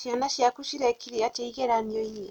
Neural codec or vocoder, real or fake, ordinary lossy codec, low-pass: none; real; none; 19.8 kHz